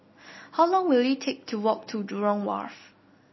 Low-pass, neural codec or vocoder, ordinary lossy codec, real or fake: 7.2 kHz; none; MP3, 24 kbps; real